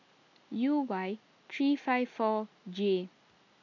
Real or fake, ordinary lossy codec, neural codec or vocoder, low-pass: real; none; none; 7.2 kHz